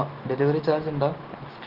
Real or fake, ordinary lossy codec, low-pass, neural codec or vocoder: real; Opus, 16 kbps; 5.4 kHz; none